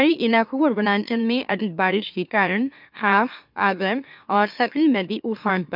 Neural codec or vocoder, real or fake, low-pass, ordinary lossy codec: autoencoder, 44.1 kHz, a latent of 192 numbers a frame, MeloTTS; fake; 5.4 kHz; none